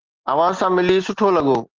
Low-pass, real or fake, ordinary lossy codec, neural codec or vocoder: 7.2 kHz; real; Opus, 16 kbps; none